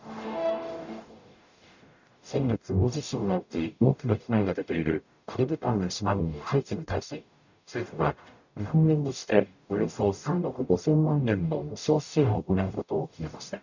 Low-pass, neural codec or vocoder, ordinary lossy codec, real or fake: 7.2 kHz; codec, 44.1 kHz, 0.9 kbps, DAC; none; fake